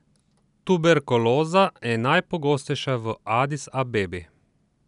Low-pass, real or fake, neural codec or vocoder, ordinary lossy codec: 10.8 kHz; real; none; none